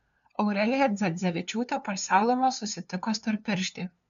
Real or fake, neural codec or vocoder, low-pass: fake; codec, 16 kHz, 2 kbps, FunCodec, trained on LibriTTS, 25 frames a second; 7.2 kHz